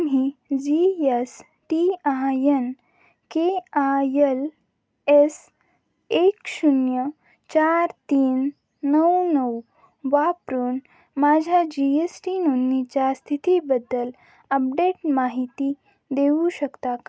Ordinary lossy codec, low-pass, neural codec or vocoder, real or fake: none; none; none; real